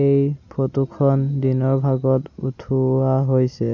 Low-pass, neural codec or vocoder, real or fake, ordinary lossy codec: 7.2 kHz; none; real; none